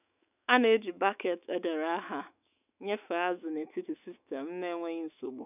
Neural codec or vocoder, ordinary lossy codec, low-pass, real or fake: autoencoder, 48 kHz, 128 numbers a frame, DAC-VAE, trained on Japanese speech; none; 3.6 kHz; fake